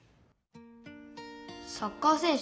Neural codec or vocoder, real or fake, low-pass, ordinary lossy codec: none; real; none; none